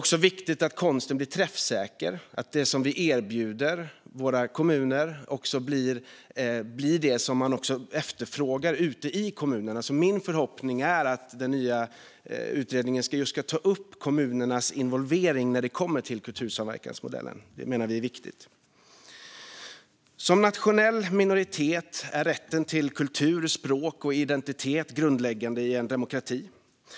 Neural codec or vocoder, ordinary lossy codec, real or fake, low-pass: none; none; real; none